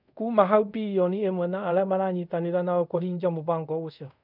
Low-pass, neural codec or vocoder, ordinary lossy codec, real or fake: 5.4 kHz; codec, 24 kHz, 0.5 kbps, DualCodec; none; fake